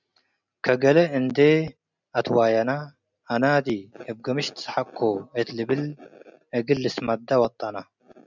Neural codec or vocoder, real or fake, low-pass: none; real; 7.2 kHz